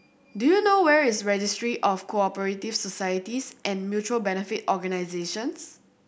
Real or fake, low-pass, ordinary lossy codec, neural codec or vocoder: real; none; none; none